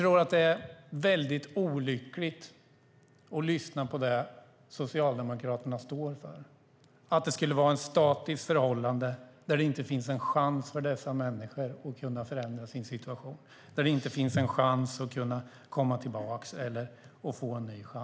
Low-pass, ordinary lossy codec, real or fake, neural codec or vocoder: none; none; real; none